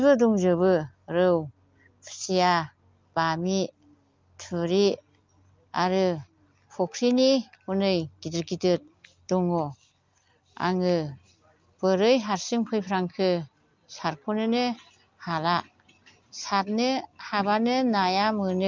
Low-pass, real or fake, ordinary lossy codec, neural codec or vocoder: 7.2 kHz; real; Opus, 24 kbps; none